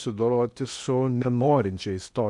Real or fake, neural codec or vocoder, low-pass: fake; codec, 16 kHz in and 24 kHz out, 0.8 kbps, FocalCodec, streaming, 65536 codes; 10.8 kHz